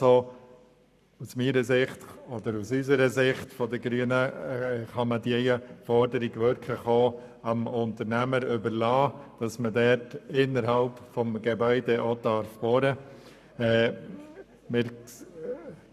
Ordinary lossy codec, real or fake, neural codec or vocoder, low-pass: none; fake; codec, 44.1 kHz, 7.8 kbps, Pupu-Codec; 14.4 kHz